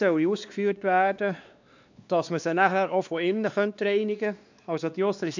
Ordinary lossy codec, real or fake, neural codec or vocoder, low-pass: none; fake; codec, 16 kHz, 2 kbps, X-Codec, WavLM features, trained on Multilingual LibriSpeech; 7.2 kHz